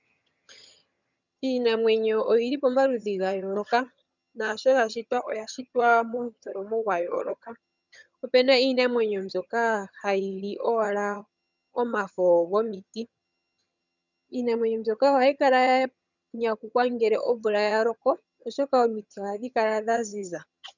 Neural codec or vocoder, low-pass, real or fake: vocoder, 22.05 kHz, 80 mel bands, HiFi-GAN; 7.2 kHz; fake